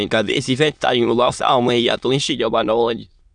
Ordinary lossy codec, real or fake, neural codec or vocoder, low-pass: none; fake; autoencoder, 22.05 kHz, a latent of 192 numbers a frame, VITS, trained on many speakers; 9.9 kHz